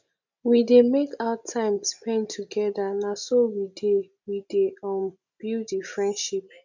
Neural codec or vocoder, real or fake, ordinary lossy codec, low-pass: none; real; none; 7.2 kHz